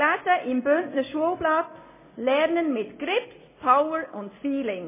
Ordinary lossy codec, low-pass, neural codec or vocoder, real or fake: MP3, 16 kbps; 3.6 kHz; none; real